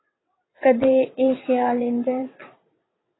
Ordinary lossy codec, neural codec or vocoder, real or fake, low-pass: AAC, 16 kbps; vocoder, 22.05 kHz, 80 mel bands, WaveNeXt; fake; 7.2 kHz